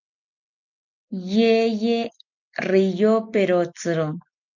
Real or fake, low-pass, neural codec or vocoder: real; 7.2 kHz; none